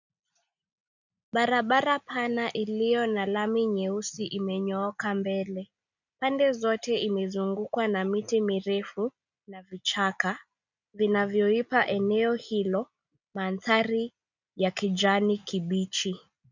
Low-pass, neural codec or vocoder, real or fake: 7.2 kHz; none; real